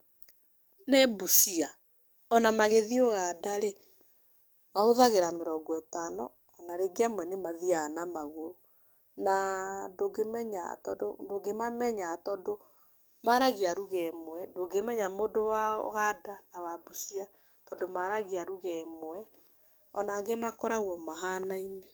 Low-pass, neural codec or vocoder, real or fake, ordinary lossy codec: none; codec, 44.1 kHz, 7.8 kbps, DAC; fake; none